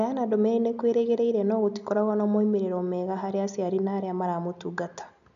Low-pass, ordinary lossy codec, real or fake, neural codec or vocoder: 7.2 kHz; none; real; none